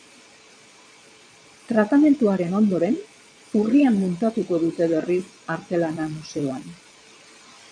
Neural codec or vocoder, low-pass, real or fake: vocoder, 22.05 kHz, 80 mel bands, Vocos; 9.9 kHz; fake